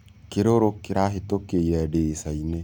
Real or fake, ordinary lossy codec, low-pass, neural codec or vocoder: real; none; 19.8 kHz; none